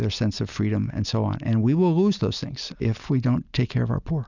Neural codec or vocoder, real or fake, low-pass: none; real; 7.2 kHz